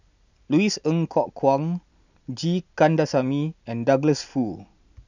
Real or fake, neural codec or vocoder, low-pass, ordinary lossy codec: real; none; 7.2 kHz; none